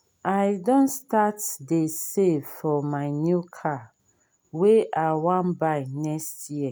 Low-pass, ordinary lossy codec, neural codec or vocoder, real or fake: none; none; none; real